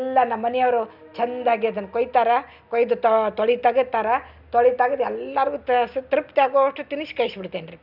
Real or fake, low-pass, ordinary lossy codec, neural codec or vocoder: real; 5.4 kHz; none; none